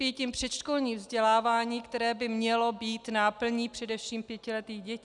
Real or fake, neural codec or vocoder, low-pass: real; none; 10.8 kHz